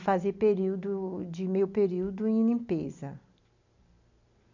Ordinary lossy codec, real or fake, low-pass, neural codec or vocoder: none; real; 7.2 kHz; none